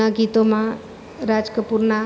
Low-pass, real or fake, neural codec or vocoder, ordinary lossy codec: none; real; none; none